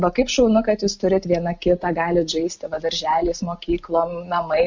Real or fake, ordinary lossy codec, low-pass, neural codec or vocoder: real; MP3, 48 kbps; 7.2 kHz; none